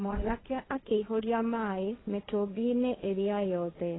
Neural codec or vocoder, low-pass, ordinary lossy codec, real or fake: codec, 16 kHz, 1.1 kbps, Voila-Tokenizer; 7.2 kHz; AAC, 16 kbps; fake